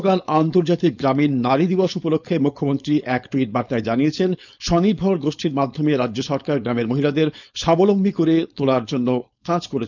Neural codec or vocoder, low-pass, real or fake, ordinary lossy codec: codec, 16 kHz, 4.8 kbps, FACodec; 7.2 kHz; fake; none